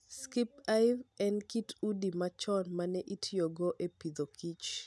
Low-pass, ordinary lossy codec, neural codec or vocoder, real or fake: none; none; none; real